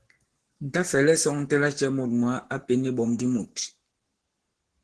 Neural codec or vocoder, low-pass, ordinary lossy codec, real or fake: codec, 44.1 kHz, 7.8 kbps, Pupu-Codec; 10.8 kHz; Opus, 16 kbps; fake